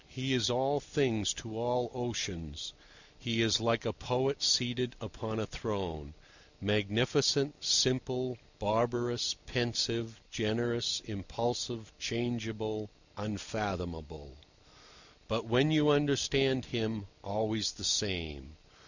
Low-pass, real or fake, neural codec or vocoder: 7.2 kHz; real; none